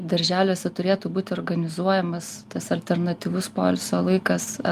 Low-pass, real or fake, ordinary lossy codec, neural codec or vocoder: 14.4 kHz; real; Opus, 32 kbps; none